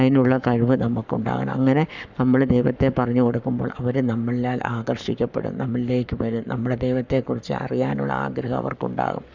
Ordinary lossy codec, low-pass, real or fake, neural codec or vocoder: none; 7.2 kHz; fake; codec, 44.1 kHz, 7.8 kbps, Pupu-Codec